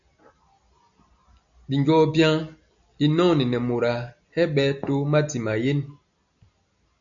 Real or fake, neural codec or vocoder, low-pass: real; none; 7.2 kHz